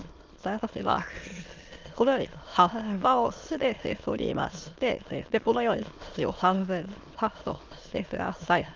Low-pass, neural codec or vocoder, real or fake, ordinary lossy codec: 7.2 kHz; autoencoder, 22.05 kHz, a latent of 192 numbers a frame, VITS, trained on many speakers; fake; Opus, 24 kbps